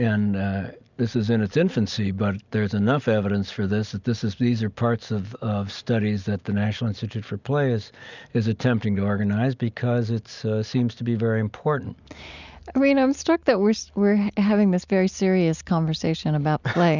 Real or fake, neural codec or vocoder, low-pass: real; none; 7.2 kHz